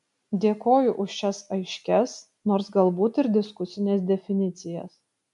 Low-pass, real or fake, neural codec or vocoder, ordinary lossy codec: 10.8 kHz; real; none; MP3, 64 kbps